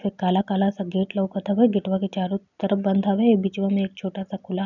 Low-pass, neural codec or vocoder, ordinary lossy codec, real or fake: 7.2 kHz; none; none; real